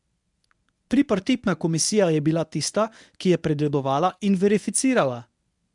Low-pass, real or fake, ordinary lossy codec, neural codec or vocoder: 10.8 kHz; fake; none; codec, 24 kHz, 0.9 kbps, WavTokenizer, medium speech release version 1